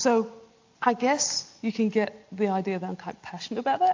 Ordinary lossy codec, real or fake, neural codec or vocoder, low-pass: AAC, 48 kbps; real; none; 7.2 kHz